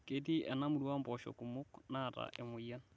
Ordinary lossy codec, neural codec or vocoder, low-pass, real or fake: none; none; none; real